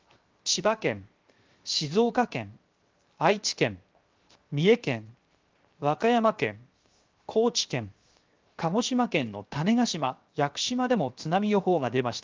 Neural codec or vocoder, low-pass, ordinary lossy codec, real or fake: codec, 16 kHz, 0.7 kbps, FocalCodec; 7.2 kHz; Opus, 24 kbps; fake